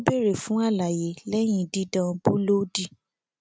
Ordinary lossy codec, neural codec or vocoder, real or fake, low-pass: none; none; real; none